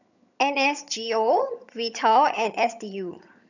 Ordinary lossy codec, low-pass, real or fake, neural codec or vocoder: none; 7.2 kHz; fake; vocoder, 22.05 kHz, 80 mel bands, HiFi-GAN